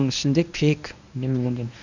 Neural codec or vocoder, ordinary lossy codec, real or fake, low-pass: codec, 24 kHz, 0.9 kbps, WavTokenizer, medium speech release version 1; none; fake; 7.2 kHz